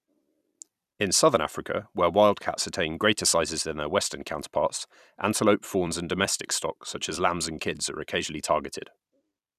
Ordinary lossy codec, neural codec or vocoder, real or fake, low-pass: none; vocoder, 44.1 kHz, 128 mel bands every 256 samples, BigVGAN v2; fake; 14.4 kHz